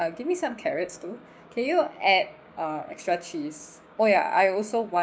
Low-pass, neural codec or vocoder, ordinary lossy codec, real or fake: none; codec, 16 kHz, 6 kbps, DAC; none; fake